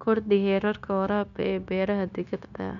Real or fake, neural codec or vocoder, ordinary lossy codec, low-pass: fake; codec, 16 kHz, 0.9 kbps, LongCat-Audio-Codec; none; 7.2 kHz